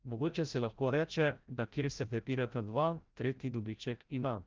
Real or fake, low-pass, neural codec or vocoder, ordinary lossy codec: fake; 7.2 kHz; codec, 16 kHz, 0.5 kbps, FreqCodec, larger model; Opus, 32 kbps